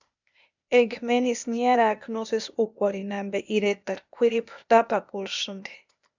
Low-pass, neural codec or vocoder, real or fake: 7.2 kHz; codec, 16 kHz, 0.8 kbps, ZipCodec; fake